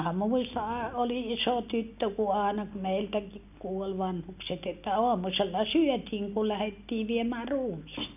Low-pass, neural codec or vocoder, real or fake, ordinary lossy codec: 3.6 kHz; none; real; none